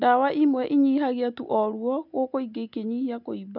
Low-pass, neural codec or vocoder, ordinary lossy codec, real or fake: 5.4 kHz; none; none; real